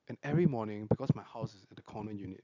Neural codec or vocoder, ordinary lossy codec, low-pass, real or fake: none; none; 7.2 kHz; real